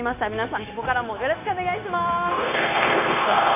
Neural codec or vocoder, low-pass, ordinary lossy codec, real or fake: codec, 16 kHz, 0.9 kbps, LongCat-Audio-Codec; 3.6 kHz; none; fake